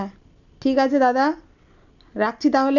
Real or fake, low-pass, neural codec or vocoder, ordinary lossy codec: real; 7.2 kHz; none; none